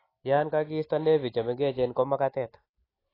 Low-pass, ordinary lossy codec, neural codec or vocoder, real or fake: 5.4 kHz; AAC, 24 kbps; none; real